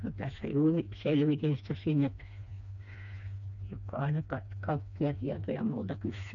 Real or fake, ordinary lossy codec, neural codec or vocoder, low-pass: fake; none; codec, 16 kHz, 2 kbps, FreqCodec, smaller model; 7.2 kHz